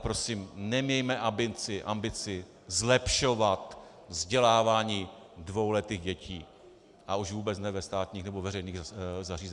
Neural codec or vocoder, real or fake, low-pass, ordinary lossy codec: none; real; 10.8 kHz; Opus, 64 kbps